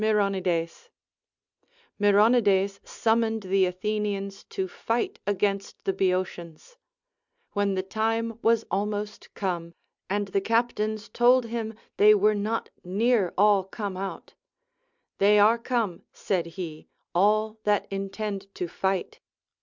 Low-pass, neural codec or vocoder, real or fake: 7.2 kHz; none; real